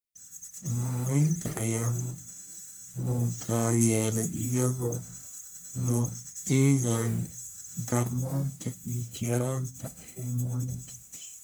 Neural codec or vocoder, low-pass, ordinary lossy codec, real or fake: codec, 44.1 kHz, 1.7 kbps, Pupu-Codec; none; none; fake